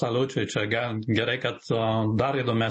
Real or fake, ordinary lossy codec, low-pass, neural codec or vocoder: real; MP3, 32 kbps; 10.8 kHz; none